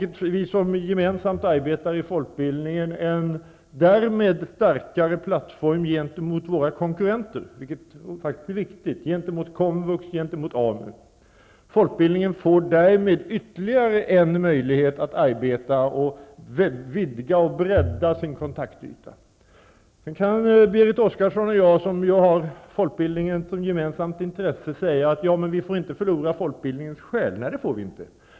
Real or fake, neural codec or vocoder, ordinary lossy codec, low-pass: real; none; none; none